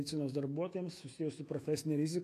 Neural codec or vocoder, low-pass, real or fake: autoencoder, 48 kHz, 128 numbers a frame, DAC-VAE, trained on Japanese speech; 14.4 kHz; fake